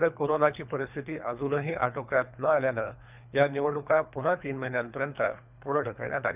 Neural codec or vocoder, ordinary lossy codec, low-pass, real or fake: codec, 24 kHz, 3 kbps, HILCodec; none; 3.6 kHz; fake